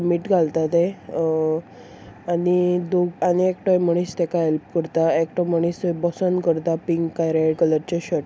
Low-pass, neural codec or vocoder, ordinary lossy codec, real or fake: none; none; none; real